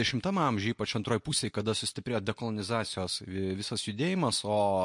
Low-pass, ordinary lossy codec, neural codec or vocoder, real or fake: 10.8 kHz; MP3, 48 kbps; none; real